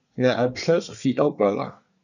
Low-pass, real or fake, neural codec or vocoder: 7.2 kHz; fake; codec, 24 kHz, 1 kbps, SNAC